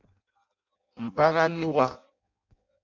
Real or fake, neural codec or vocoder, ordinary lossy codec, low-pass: fake; codec, 16 kHz in and 24 kHz out, 0.6 kbps, FireRedTTS-2 codec; MP3, 64 kbps; 7.2 kHz